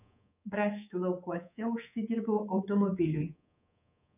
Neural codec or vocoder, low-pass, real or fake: codec, 24 kHz, 3.1 kbps, DualCodec; 3.6 kHz; fake